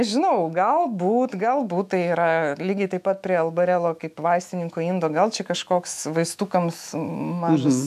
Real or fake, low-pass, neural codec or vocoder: fake; 14.4 kHz; autoencoder, 48 kHz, 128 numbers a frame, DAC-VAE, trained on Japanese speech